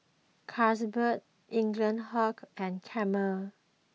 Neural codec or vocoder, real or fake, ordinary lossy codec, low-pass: none; real; none; none